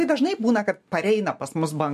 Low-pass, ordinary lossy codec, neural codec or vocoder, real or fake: 14.4 kHz; MP3, 64 kbps; none; real